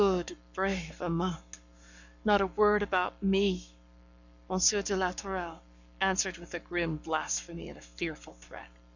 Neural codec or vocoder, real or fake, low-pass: codec, 44.1 kHz, 7.8 kbps, Pupu-Codec; fake; 7.2 kHz